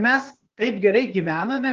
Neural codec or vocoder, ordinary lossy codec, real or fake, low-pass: codec, 16 kHz, 0.8 kbps, ZipCodec; Opus, 16 kbps; fake; 7.2 kHz